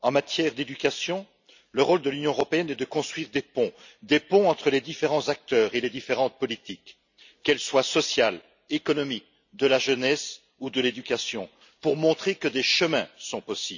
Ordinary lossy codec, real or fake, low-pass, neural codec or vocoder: none; real; 7.2 kHz; none